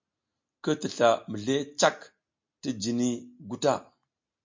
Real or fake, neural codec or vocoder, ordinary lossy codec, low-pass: real; none; MP3, 48 kbps; 7.2 kHz